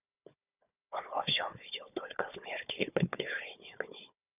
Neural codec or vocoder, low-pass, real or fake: codec, 16 kHz, 4 kbps, FunCodec, trained on Chinese and English, 50 frames a second; 3.6 kHz; fake